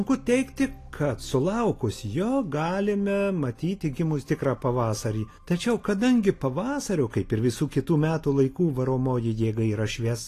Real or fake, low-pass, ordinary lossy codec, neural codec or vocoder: real; 14.4 kHz; AAC, 48 kbps; none